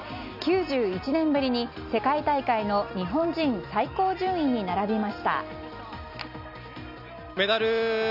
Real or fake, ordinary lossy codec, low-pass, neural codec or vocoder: real; none; 5.4 kHz; none